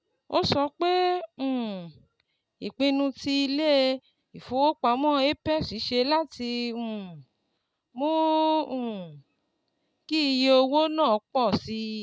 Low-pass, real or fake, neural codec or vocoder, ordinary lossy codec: none; real; none; none